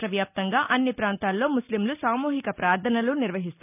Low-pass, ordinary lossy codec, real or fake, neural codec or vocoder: 3.6 kHz; none; real; none